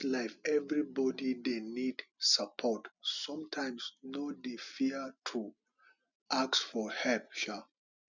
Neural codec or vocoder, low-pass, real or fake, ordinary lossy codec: none; 7.2 kHz; real; none